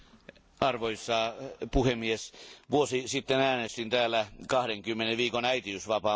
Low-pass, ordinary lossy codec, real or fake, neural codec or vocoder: none; none; real; none